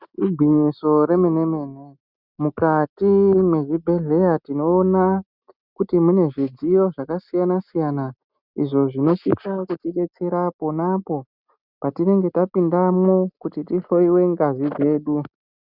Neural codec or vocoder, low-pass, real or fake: none; 5.4 kHz; real